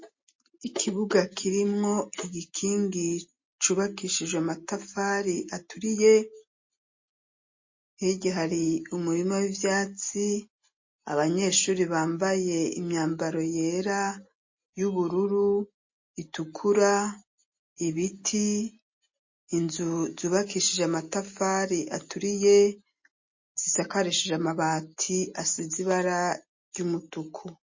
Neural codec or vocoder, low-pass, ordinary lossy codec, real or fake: none; 7.2 kHz; MP3, 32 kbps; real